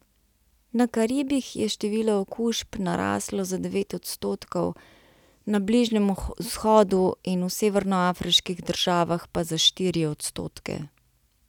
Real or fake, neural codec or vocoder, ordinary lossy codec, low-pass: real; none; none; 19.8 kHz